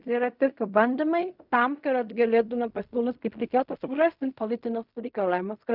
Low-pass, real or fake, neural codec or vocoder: 5.4 kHz; fake; codec, 16 kHz in and 24 kHz out, 0.4 kbps, LongCat-Audio-Codec, fine tuned four codebook decoder